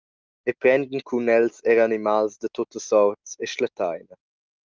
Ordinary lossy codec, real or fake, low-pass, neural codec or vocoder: Opus, 32 kbps; real; 7.2 kHz; none